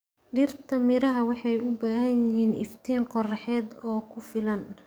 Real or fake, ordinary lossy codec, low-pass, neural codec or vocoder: fake; none; none; codec, 44.1 kHz, 7.8 kbps, DAC